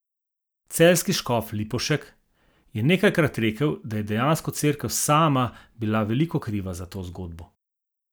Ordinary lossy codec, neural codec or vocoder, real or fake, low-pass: none; none; real; none